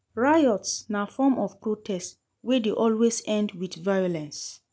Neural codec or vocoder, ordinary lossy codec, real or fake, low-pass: none; none; real; none